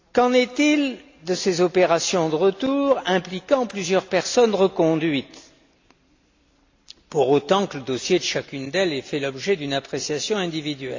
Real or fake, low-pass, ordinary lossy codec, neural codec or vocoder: real; 7.2 kHz; AAC, 48 kbps; none